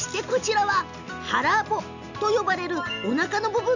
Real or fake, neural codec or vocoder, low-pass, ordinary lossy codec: real; none; 7.2 kHz; none